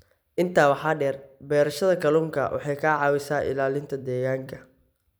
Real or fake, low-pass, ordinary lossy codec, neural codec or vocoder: fake; none; none; vocoder, 44.1 kHz, 128 mel bands every 256 samples, BigVGAN v2